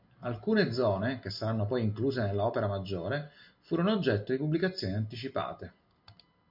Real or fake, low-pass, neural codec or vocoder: real; 5.4 kHz; none